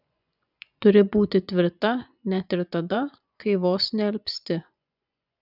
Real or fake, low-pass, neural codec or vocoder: fake; 5.4 kHz; vocoder, 24 kHz, 100 mel bands, Vocos